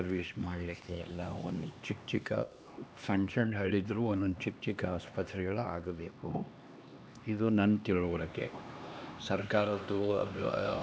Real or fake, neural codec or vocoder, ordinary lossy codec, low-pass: fake; codec, 16 kHz, 2 kbps, X-Codec, HuBERT features, trained on LibriSpeech; none; none